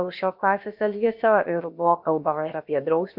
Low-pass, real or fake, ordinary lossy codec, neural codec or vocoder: 5.4 kHz; fake; MP3, 48 kbps; codec, 16 kHz, about 1 kbps, DyCAST, with the encoder's durations